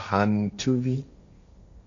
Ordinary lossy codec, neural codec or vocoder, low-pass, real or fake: AAC, 48 kbps; codec, 16 kHz, 1.1 kbps, Voila-Tokenizer; 7.2 kHz; fake